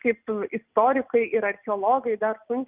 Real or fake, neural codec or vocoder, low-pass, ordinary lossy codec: real; none; 3.6 kHz; Opus, 24 kbps